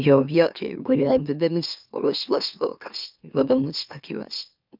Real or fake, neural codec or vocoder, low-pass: fake; autoencoder, 44.1 kHz, a latent of 192 numbers a frame, MeloTTS; 5.4 kHz